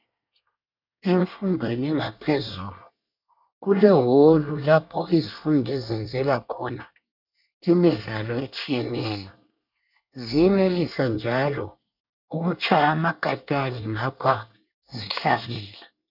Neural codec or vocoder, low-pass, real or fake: codec, 24 kHz, 1 kbps, SNAC; 5.4 kHz; fake